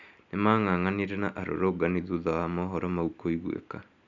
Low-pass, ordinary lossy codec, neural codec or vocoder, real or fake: 7.2 kHz; none; none; real